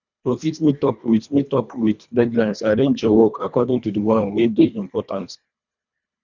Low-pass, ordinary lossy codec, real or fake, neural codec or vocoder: 7.2 kHz; none; fake; codec, 24 kHz, 1.5 kbps, HILCodec